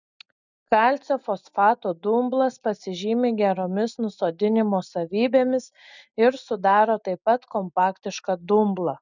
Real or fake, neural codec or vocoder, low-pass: real; none; 7.2 kHz